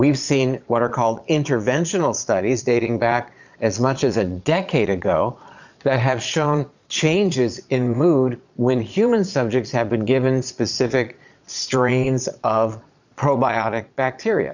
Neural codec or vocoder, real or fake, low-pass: vocoder, 22.05 kHz, 80 mel bands, Vocos; fake; 7.2 kHz